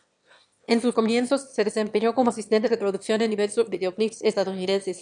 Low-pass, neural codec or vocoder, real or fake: 9.9 kHz; autoencoder, 22.05 kHz, a latent of 192 numbers a frame, VITS, trained on one speaker; fake